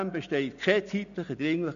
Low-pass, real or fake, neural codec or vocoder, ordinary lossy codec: 7.2 kHz; real; none; none